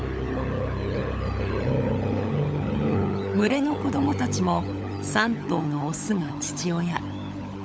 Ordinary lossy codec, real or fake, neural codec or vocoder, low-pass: none; fake; codec, 16 kHz, 16 kbps, FunCodec, trained on LibriTTS, 50 frames a second; none